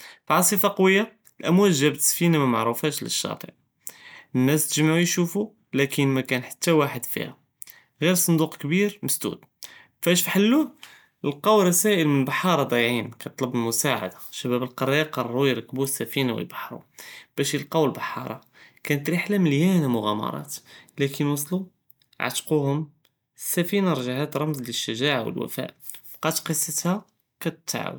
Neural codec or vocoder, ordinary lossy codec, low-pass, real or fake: none; none; none; real